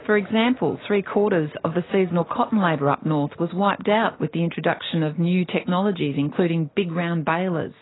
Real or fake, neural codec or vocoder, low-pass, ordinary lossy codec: real; none; 7.2 kHz; AAC, 16 kbps